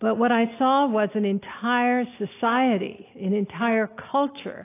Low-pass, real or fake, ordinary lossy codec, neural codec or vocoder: 3.6 kHz; real; AAC, 24 kbps; none